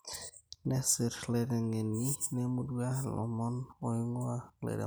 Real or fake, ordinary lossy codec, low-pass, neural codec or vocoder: real; none; none; none